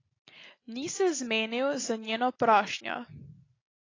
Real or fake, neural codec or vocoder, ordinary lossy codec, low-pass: real; none; AAC, 32 kbps; 7.2 kHz